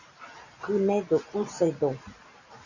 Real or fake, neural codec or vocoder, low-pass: fake; vocoder, 44.1 kHz, 128 mel bands every 512 samples, BigVGAN v2; 7.2 kHz